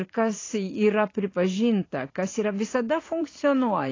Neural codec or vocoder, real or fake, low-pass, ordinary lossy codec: vocoder, 44.1 kHz, 128 mel bands every 512 samples, BigVGAN v2; fake; 7.2 kHz; AAC, 32 kbps